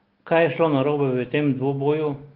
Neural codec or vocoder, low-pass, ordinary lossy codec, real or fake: none; 5.4 kHz; Opus, 16 kbps; real